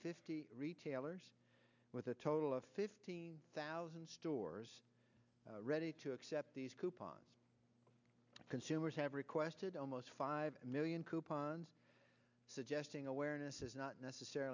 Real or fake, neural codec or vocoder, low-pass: real; none; 7.2 kHz